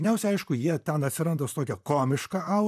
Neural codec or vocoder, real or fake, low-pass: vocoder, 44.1 kHz, 128 mel bands, Pupu-Vocoder; fake; 14.4 kHz